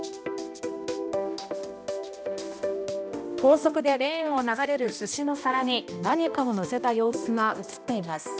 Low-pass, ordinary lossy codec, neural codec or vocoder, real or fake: none; none; codec, 16 kHz, 1 kbps, X-Codec, HuBERT features, trained on balanced general audio; fake